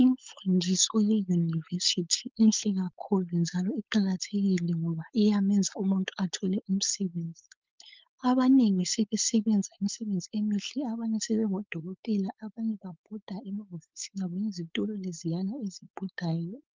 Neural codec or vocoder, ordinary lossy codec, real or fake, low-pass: codec, 16 kHz, 4.8 kbps, FACodec; Opus, 32 kbps; fake; 7.2 kHz